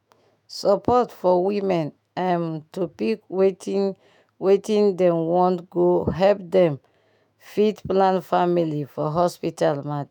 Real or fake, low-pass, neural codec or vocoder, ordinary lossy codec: fake; 19.8 kHz; autoencoder, 48 kHz, 128 numbers a frame, DAC-VAE, trained on Japanese speech; none